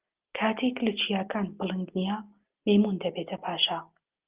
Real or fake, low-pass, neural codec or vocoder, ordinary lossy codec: real; 3.6 kHz; none; Opus, 24 kbps